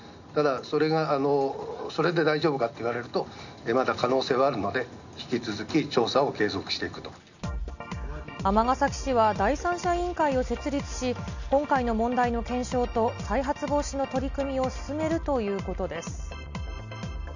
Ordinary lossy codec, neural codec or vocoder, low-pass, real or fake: none; none; 7.2 kHz; real